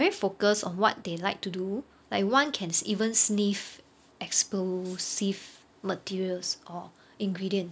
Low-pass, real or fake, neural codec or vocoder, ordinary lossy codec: none; real; none; none